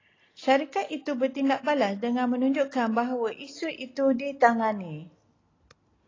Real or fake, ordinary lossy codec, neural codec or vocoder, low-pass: real; AAC, 32 kbps; none; 7.2 kHz